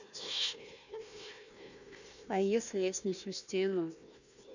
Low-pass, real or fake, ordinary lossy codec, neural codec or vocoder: 7.2 kHz; fake; none; codec, 16 kHz, 1 kbps, FunCodec, trained on Chinese and English, 50 frames a second